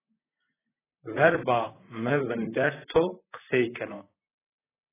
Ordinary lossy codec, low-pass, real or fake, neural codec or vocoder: AAC, 16 kbps; 3.6 kHz; fake; vocoder, 44.1 kHz, 128 mel bands, Pupu-Vocoder